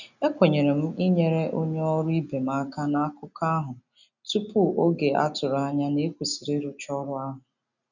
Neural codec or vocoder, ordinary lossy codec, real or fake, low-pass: none; none; real; 7.2 kHz